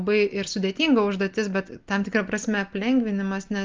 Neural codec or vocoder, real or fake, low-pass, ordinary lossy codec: none; real; 7.2 kHz; Opus, 24 kbps